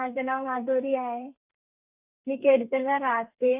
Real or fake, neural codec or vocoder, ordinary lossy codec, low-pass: fake; codec, 32 kHz, 1.9 kbps, SNAC; none; 3.6 kHz